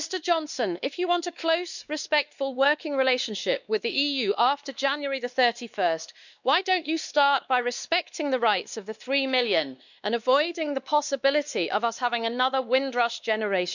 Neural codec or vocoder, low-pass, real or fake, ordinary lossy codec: codec, 16 kHz, 2 kbps, X-Codec, WavLM features, trained on Multilingual LibriSpeech; 7.2 kHz; fake; none